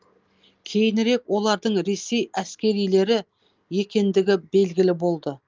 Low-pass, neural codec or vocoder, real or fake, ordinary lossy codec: 7.2 kHz; none; real; Opus, 24 kbps